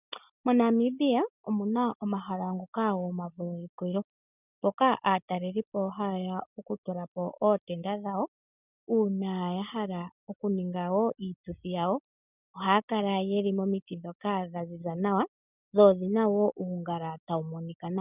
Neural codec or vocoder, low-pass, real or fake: none; 3.6 kHz; real